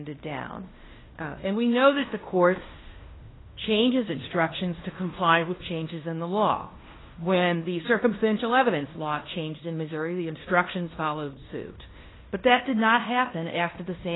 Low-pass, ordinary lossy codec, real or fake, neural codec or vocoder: 7.2 kHz; AAC, 16 kbps; fake; codec, 16 kHz in and 24 kHz out, 0.9 kbps, LongCat-Audio-Codec, fine tuned four codebook decoder